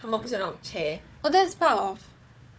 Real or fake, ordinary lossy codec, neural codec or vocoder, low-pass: fake; none; codec, 16 kHz, 4 kbps, FunCodec, trained on Chinese and English, 50 frames a second; none